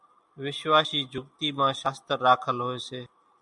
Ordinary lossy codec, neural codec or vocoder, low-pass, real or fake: MP3, 96 kbps; none; 10.8 kHz; real